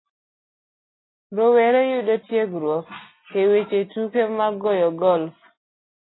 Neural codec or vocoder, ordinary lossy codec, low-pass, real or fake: none; AAC, 16 kbps; 7.2 kHz; real